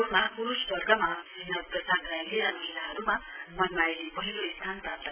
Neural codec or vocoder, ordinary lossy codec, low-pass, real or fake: none; none; 3.6 kHz; real